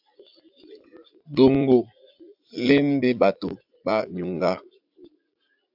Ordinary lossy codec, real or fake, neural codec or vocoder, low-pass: AAC, 48 kbps; fake; vocoder, 44.1 kHz, 80 mel bands, Vocos; 5.4 kHz